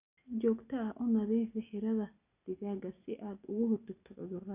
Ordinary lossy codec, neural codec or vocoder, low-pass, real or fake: none; none; 3.6 kHz; real